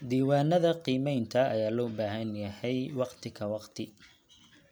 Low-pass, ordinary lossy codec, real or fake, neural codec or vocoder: none; none; real; none